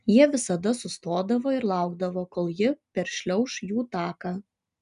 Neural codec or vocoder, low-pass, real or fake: none; 10.8 kHz; real